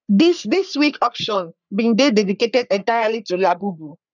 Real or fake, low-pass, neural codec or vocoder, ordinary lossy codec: fake; 7.2 kHz; codec, 44.1 kHz, 3.4 kbps, Pupu-Codec; none